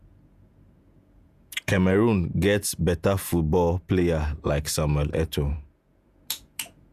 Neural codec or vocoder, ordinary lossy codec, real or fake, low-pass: vocoder, 48 kHz, 128 mel bands, Vocos; none; fake; 14.4 kHz